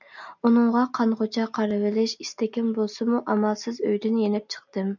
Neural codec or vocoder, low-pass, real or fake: none; 7.2 kHz; real